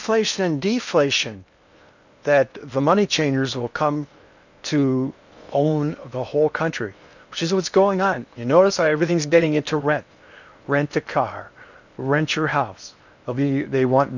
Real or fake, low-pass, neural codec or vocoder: fake; 7.2 kHz; codec, 16 kHz in and 24 kHz out, 0.8 kbps, FocalCodec, streaming, 65536 codes